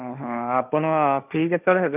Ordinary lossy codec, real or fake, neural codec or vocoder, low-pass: none; fake; codec, 16 kHz, 1.1 kbps, Voila-Tokenizer; 3.6 kHz